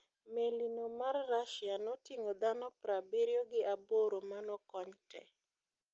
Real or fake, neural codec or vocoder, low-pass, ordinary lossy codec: real; none; 7.2 kHz; Opus, 24 kbps